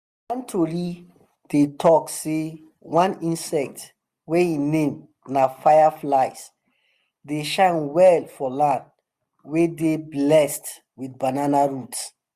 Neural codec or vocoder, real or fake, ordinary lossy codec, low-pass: none; real; Opus, 64 kbps; 14.4 kHz